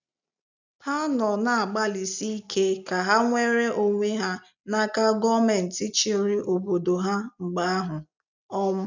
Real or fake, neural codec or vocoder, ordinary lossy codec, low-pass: real; none; none; 7.2 kHz